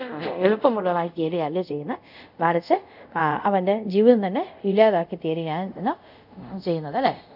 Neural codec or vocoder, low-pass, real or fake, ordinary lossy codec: codec, 24 kHz, 0.5 kbps, DualCodec; 5.4 kHz; fake; none